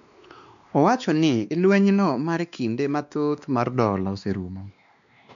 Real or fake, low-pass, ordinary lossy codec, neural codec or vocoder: fake; 7.2 kHz; none; codec, 16 kHz, 2 kbps, X-Codec, WavLM features, trained on Multilingual LibriSpeech